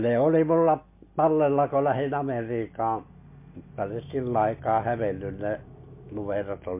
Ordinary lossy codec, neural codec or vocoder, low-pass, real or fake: MP3, 24 kbps; none; 3.6 kHz; real